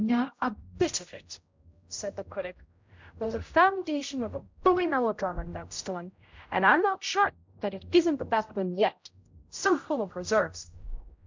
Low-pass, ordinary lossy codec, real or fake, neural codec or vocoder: 7.2 kHz; MP3, 64 kbps; fake; codec, 16 kHz, 0.5 kbps, X-Codec, HuBERT features, trained on general audio